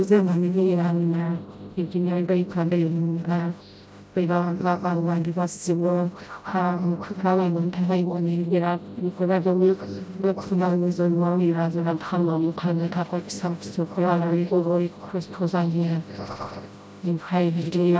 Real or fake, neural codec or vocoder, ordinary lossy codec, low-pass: fake; codec, 16 kHz, 0.5 kbps, FreqCodec, smaller model; none; none